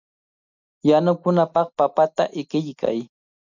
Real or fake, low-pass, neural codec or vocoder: real; 7.2 kHz; none